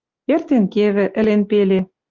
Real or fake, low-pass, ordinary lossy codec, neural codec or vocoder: real; 7.2 kHz; Opus, 32 kbps; none